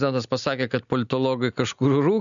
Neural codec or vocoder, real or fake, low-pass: none; real; 7.2 kHz